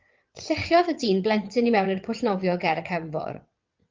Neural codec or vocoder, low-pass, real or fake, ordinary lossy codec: vocoder, 22.05 kHz, 80 mel bands, WaveNeXt; 7.2 kHz; fake; Opus, 24 kbps